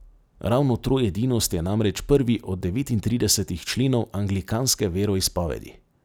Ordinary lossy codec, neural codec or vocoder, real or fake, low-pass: none; none; real; none